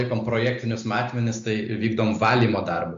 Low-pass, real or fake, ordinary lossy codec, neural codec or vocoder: 7.2 kHz; real; MP3, 48 kbps; none